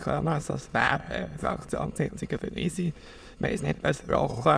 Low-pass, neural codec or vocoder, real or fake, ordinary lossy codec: none; autoencoder, 22.05 kHz, a latent of 192 numbers a frame, VITS, trained on many speakers; fake; none